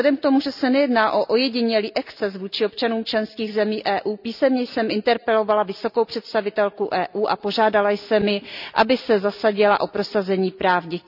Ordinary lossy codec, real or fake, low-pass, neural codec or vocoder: none; real; 5.4 kHz; none